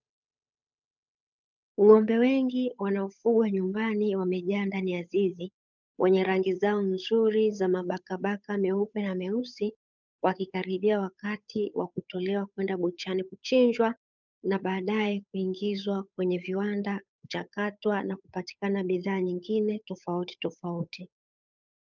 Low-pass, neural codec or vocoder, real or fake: 7.2 kHz; codec, 16 kHz, 8 kbps, FunCodec, trained on Chinese and English, 25 frames a second; fake